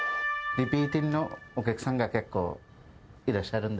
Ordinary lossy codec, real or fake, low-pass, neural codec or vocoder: none; real; none; none